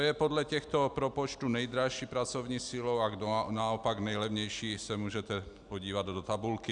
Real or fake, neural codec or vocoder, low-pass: real; none; 9.9 kHz